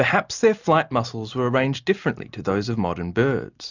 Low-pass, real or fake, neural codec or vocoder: 7.2 kHz; real; none